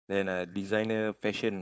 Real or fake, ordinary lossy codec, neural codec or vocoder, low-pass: fake; none; codec, 16 kHz, 4.8 kbps, FACodec; none